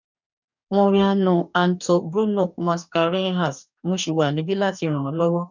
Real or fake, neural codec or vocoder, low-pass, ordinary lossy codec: fake; codec, 44.1 kHz, 2.6 kbps, DAC; 7.2 kHz; none